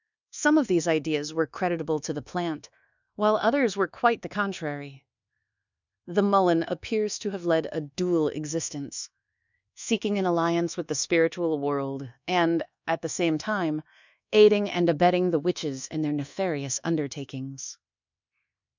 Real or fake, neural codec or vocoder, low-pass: fake; codec, 24 kHz, 1.2 kbps, DualCodec; 7.2 kHz